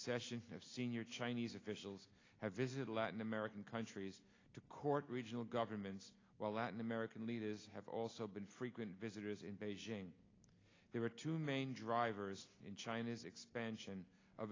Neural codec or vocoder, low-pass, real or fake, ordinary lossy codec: none; 7.2 kHz; real; AAC, 32 kbps